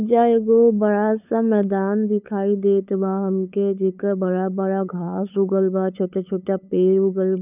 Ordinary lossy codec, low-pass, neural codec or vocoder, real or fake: none; 3.6 kHz; codec, 16 kHz, 8 kbps, FunCodec, trained on LibriTTS, 25 frames a second; fake